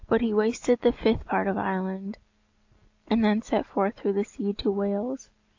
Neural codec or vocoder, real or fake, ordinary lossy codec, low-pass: none; real; AAC, 48 kbps; 7.2 kHz